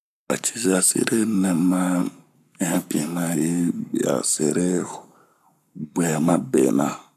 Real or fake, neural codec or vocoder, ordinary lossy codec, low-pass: fake; codec, 44.1 kHz, 7.8 kbps, Pupu-Codec; none; 14.4 kHz